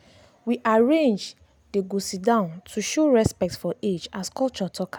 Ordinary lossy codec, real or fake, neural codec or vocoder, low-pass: none; real; none; none